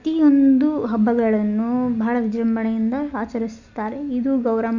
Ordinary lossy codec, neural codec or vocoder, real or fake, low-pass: none; none; real; 7.2 kHz